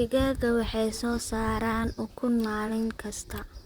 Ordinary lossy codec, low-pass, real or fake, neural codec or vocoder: Opus, 64 kbps; 19.8 kHz; fake; vocoder, 44.1 kHz, 128 mel bands, Pupu-Vocoder